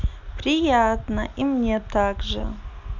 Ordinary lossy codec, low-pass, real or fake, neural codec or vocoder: none; 7.2 kHz; real; none